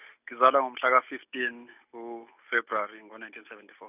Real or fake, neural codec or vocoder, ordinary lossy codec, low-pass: real; none; none; 3.6 kHz